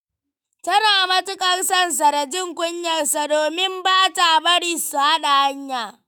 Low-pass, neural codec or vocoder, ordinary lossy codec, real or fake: none; autoencoder, 48 kHz, 128 numbers a frame, DAC-VAE, trained on Japanese speech; none; fake